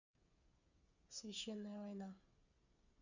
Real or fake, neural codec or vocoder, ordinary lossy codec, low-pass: fake; codec, 16 kHz, 8 kbps, FreqCodec, smaller model; none; 7.2 kHz